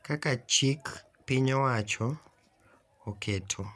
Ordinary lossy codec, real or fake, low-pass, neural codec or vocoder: none; real; none; none